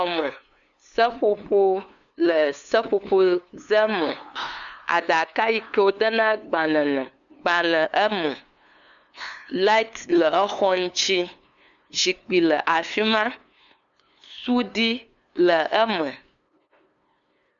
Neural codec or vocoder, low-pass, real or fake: codec, 16 kHz, 2 kbps, FunCodec, trained on LibriTTS, 25 frames a second; 7.2 kHz; fake